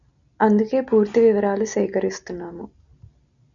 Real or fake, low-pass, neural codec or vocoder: real; 7.2 kHz; none